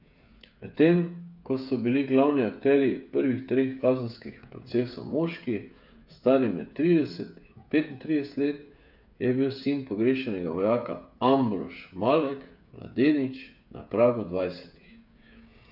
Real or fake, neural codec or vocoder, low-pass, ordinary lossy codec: fake; codec, 16 kHz, 8 kbps, FreqCodec, smaller model; 5.4 kHz; none